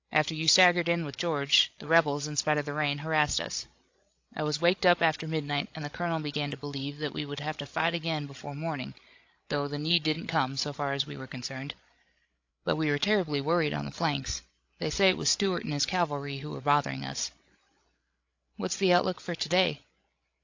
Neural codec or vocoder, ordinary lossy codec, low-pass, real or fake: codec, 16 kHz, 16 kbps, FreqCodec, larger model; AAC, 48 kbps; 7.2 kHz; fake